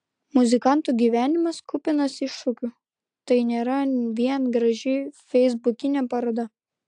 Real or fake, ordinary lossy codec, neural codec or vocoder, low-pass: real; AAC, 64 kbps; none; 9.9 kHz